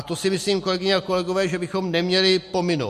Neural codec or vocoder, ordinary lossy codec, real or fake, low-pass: none; MP3, 64 kbps; real; 14.4 kHz